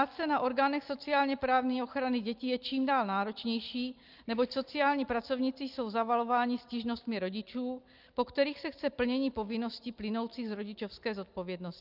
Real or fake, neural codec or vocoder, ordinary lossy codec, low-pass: real; none; Opus, 24 kbps; 5.4 kHz